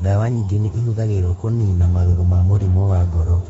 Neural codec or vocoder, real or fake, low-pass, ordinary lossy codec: autoencoder, 48 kHz, 32 numbers a frame, DAC-VAE, trained on Japanese speech; fake; 19.8 kHz; AAC, 24 kbps